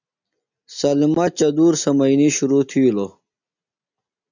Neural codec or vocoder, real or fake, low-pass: none; real; 7.2 kHz